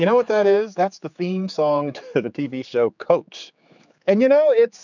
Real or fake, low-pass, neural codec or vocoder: fake; 7.2 kHz; codec, 16 kHz, 4 kbps, X-Codec, HuBERT features, trained on general audio